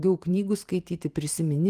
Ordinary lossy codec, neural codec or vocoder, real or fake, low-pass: Opus, 24 kbps; vocoder, 44.1 kHz, 128 mel bands every 512 samples, BigVGAN v2; fake; 14.4 kHz